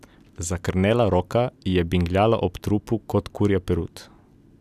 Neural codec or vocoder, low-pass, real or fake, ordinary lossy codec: none; 14.4 kHz; real; none